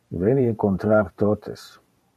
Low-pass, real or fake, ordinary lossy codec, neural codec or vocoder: 14.4 kHz; fake; MP3, 96 kbps; vocoder, 44.1 kHz, 128 mel bands every 512 samples, BigVGAN v2